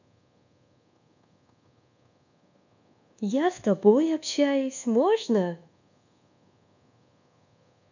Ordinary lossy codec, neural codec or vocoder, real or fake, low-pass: none; codec, 24 kHz, 1.2 kbps, DualCodec; fake; 7.2 kHz